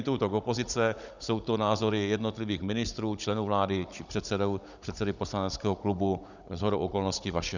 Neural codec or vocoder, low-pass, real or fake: codec, 16 kHz, 16 kbps, FunCodec, trained on Chinese and English, 50 frames a second; 7.2 kHz; fake